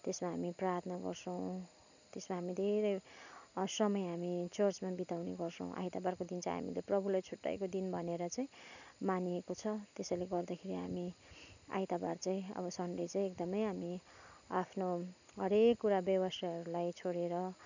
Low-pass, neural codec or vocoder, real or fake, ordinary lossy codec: 7.2 kHz; none; real; none